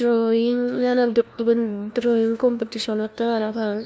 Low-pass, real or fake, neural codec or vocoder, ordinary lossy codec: none; fake; codec, 16 kHz, 1 kbps, FunCodec, trained on LibriTTS, 50 frames a second; none